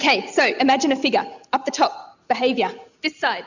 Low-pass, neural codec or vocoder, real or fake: 7.2 kHz; none; real